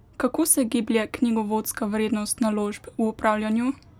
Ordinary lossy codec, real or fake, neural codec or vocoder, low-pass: none; real; none; 19.8 kHz